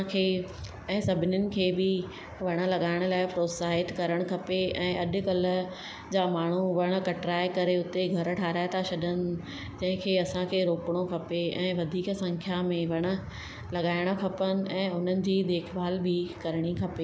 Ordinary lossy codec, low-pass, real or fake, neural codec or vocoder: none; none; real; none